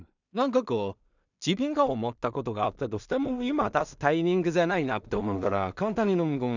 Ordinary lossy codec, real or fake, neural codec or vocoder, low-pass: none; fake; codec, 16 kHz in and 24 kHz out, 0.4 kbps, LongCat-Audio-Codec, two codebook decoder; 7.2 kHz